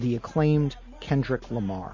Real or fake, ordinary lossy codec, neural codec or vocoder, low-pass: real; MP3, 32 kbps; none; 7.2 kHz